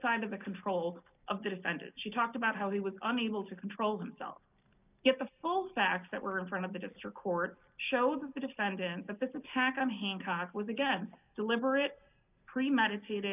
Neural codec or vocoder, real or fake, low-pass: none; real; 3.6 kHz